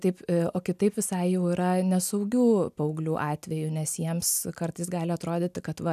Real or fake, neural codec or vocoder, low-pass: real; none; 14.4 kHz